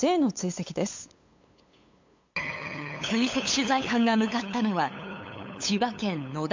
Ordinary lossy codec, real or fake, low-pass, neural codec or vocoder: MP3, 48 kbps; fake; 7.2 kHz; codec, 16 kHz, 8 kbps, FunCodec, trained on LibriTTS, 25 frames a second